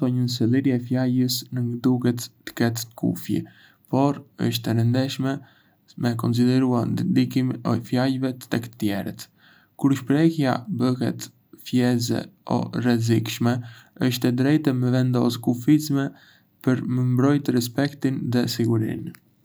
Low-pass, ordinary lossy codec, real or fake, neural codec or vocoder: none; none; real; none